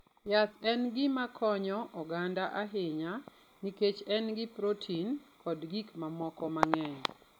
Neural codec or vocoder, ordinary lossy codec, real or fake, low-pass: none; none; real; 19.8 kHz